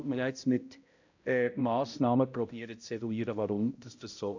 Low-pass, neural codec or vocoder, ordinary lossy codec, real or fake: 7.2 kHz; codec, 16 kHz, 0.5 kbps, X-Codec, HuBERT features, trained on balanced general audio; AAC, 48 kbps; fake